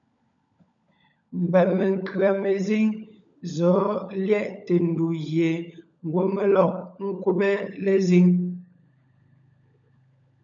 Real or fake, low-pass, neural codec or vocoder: fake; 7.2 kHz; codec, 16 kHz, 16 kbps, FunCodec, trained on LibriTTS, 50 frames a second